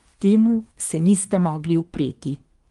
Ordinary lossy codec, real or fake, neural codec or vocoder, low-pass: Opus, 32 kbps; fake; codec, 24 kHz, 1 kbps, SNAC; 10.8 kHz